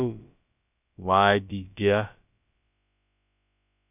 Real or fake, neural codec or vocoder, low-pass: fake; codec, 16 kHz, about 1 kbps, DyCAST, with the encoder's durations; 3.6 kHz